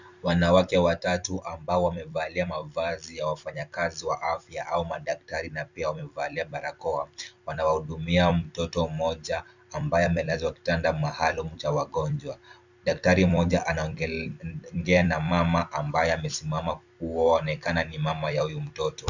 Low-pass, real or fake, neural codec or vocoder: 7.2 kHz; fake; vocoder, 44.1 kHz, 128 mel bands every 256 samples, BigVGAN v2